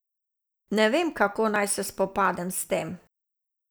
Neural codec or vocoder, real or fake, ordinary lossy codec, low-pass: none; real; none; none